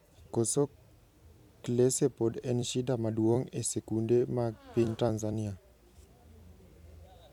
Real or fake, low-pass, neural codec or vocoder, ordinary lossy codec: fake; 19.8 kHz; vocoder, 44.1 kHz, 128 mel bands every 256 samples, BigVGAN v2; none